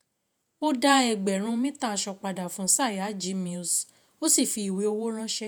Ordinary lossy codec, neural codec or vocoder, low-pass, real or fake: none; vocoder, 48 kHz, 128 mel bands, Vocos; none; fake